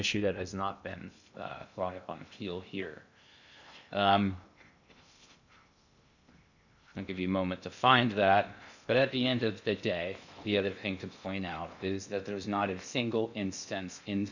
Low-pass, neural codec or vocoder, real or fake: 7.2 kHz; codec, 16 kHz in and 24 kHz out, 0.6 kbps, FocalCodec, streaming, 2048 codes; fake